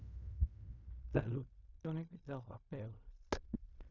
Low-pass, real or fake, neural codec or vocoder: 7.2 kHz; fake; codec, 16 kHz in and 24 kHz out, 0.4 kbps, LongCat-Audio-Codec, fine tuned four codebook decoder